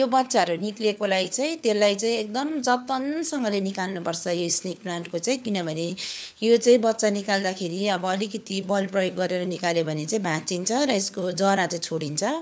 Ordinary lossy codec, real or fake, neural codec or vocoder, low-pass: none; fake; codec, 16 kHz, 4 kbps, FunCodec, trained on LibriTTS, 50 frames a second; none